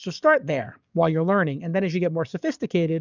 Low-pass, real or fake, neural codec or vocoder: 7.2 kHz; fake; codec, 44.1 kHz, 7.8 kbps, DAC